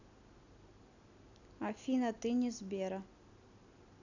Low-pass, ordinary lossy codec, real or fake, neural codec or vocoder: 7.2 kHz; none; real; none